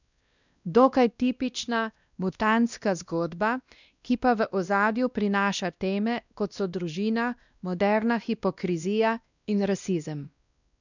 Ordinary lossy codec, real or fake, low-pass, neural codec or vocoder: none; fake; 7.2 kHz; codec, 16 kHz, 1 kbps, X-Codec, WavLM features, trained on Multilingual LibriSpeech